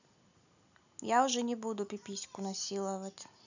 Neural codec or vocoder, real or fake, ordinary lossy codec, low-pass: none; real; none; 7.2 kHz